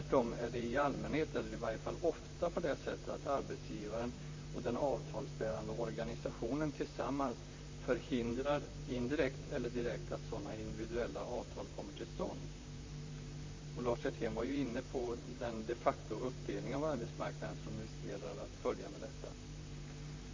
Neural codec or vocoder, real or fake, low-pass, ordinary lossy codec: vocoder, 44.1 kHz, 128 mel bands, Pupu-Vocoder; fake; 7.2 kHz; MP3, 32 kbps